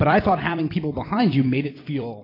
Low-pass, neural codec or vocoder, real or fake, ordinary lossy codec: 5.4 kHz; vocoder, 44.1 kHz, 128 mel bands every 256 samples, BigVGAN v2; fake; AAC, 24 kbps